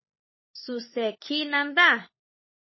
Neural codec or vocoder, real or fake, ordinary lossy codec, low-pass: codec, 16 kHz, 16 kbps, FunCodec, trained on LibriTTS, 50 frames a second; fake; MP3, 24 kbps; 7.2 kHz